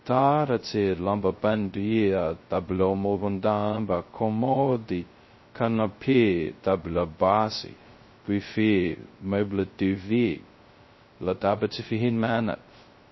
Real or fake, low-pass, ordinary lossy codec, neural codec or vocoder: fake; 7.2 kHz; MP3, 24 kbps; codec, 16 kHz, 0.2 kbps, FocalCodec